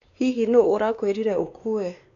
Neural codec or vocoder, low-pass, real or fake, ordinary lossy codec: codec, 16 kHz, 2 kbps, X-Codec, WavLM features, trained on Multilingual LibriSpeech; 7.2 kHz; fake; none